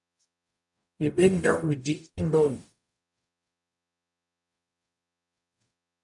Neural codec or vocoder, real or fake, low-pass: codec, 44.1 kHz, 0.9 kbps, DAC; fake; 10.8 kHz